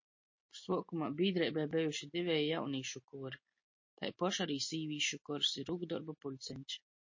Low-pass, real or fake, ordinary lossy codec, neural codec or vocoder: 7.2 kHz; real; MP3, 32 kbps; none